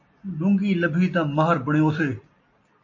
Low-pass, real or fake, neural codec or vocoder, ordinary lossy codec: 7.2 kHz; real; none; MP3, 32 kbps